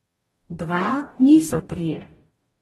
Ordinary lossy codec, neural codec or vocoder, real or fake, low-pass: AAC, 32 kbps; codec, 44.1 kHz, 0.9 kbps, DAC; fake; 19.8 kHz